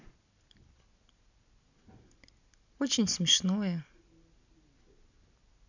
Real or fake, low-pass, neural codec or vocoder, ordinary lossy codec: real; 7.2 kHz; none; none